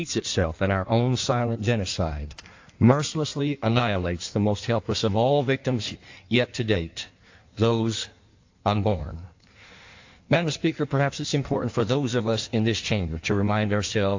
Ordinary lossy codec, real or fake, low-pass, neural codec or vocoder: AAC, 48 kbps; fake; 7.2 kHz; codec, 16 kHz in and 24 kHz out, 1.1 kbps, FireRedTTS-2 codec